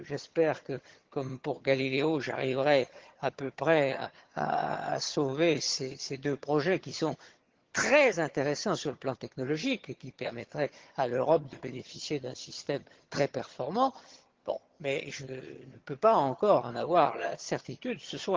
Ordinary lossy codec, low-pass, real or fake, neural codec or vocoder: Opus, 16 kbps; 7.2 kHz; fake; vocoder, 22.05 kHz, 80 mel bands, HiFi-GAN